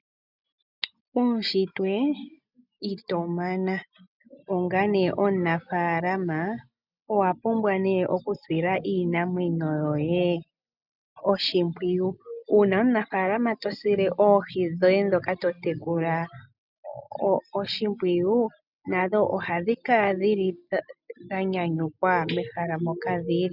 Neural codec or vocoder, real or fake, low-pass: none; real; 5.4 kHz